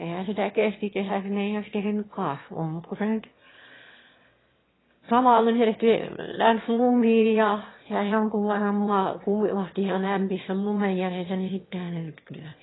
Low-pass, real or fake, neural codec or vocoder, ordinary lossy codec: 7.2 kHz; fake; autoencoder, 22.05 kHz, a latent of 192 numbers a frame, VITS, trained on one speaker; AAC, 16 kbps